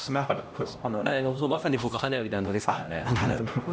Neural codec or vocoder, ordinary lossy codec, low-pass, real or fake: codec, 16 kHz, 1 kbps, X-Codec, HuBERT features, trained on LibriSpeech; none; none; fake